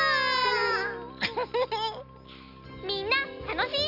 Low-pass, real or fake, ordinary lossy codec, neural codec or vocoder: 5.4 kHz; real; Opus, 64 kbps; none